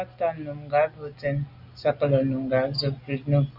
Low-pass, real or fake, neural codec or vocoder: 5.4 kHz; real; none